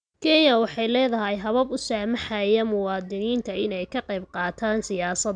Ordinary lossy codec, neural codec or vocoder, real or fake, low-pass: none; none; real; 9.9 kHz